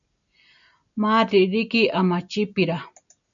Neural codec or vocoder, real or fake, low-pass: none; real; 7.2 kHz